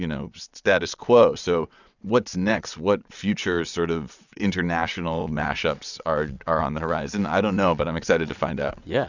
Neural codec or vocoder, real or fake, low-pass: vocoder, 22.05 kHz, 80 mel bands, WaveNeXt; fake; 7.2 kHz